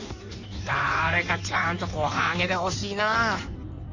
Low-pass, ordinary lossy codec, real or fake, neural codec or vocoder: 7.2 kHz; none; fake; codec, 16 kHz in and 24 kHz out, 1.1 kbps, FireRedTTS-2 codec